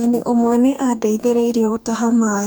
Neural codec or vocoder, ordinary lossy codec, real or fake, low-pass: codec, 44.1 kHz, 2.6 kbps, DAC; none; fake; none